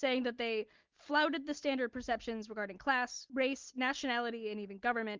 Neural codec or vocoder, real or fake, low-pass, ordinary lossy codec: none; real; 7.2 kHz; Opus, 32 kbps